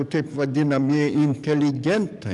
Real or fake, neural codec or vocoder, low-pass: fake; codec, 44.1 kHz, 7.8 kbps, DAC; 10.8 kHz